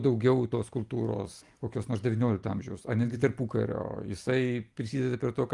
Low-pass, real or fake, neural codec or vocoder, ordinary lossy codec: 10.8 kHz; real; none; Opus, 32 kbps